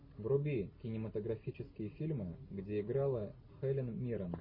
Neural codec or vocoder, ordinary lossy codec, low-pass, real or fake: none; MP3, 24 kbps; 5.4 kHz; real